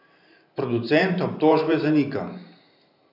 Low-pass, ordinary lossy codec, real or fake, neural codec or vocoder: 5.4 kHz; none; fake; vocoder, 44.1 kHz, 128 mel bands every 512 samples, BigVGAN v2